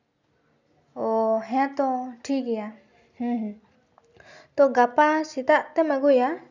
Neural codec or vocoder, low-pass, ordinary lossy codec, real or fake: none; 7.2 kHz; none; real